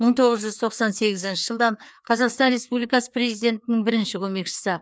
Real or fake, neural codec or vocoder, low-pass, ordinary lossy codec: fake; codec, 16 kHz, 2 kbps, FreqCodec, larger model; none; none